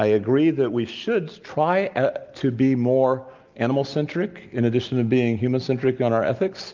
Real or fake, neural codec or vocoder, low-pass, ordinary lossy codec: real; none; 7.2 kHz; Opus, 24 kbps